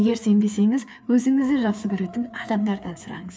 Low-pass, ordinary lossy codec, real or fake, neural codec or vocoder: none; none; fake; codec, 16 kHz, 4 kbps, FreqCodec, larger model